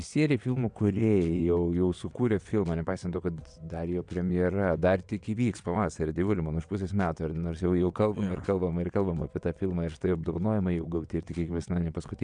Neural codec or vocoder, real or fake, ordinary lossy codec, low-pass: vocoder, 22.05 kHz, 80 mel bands, WaveNeXt; fake; MP3, 96 kbps; 9.9 kHz